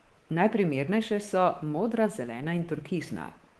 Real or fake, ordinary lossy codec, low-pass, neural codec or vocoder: fake; Opus, 16 kbps; 10.8 kHz; codec, 24 kHz, 3.1 kbps, DualCodec